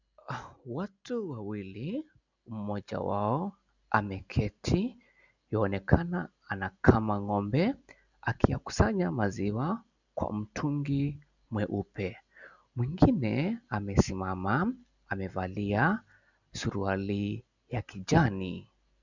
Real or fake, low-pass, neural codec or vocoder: real; 7.2 kHz; none